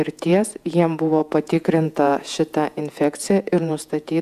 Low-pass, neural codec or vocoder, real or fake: 14.4 kHz; vocoder, 48 kHz, 128 mel bands, Vocos; fake